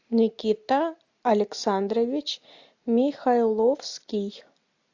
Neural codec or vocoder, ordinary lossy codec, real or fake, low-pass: none; AAC, 48 kbps; real; 7.2 kHz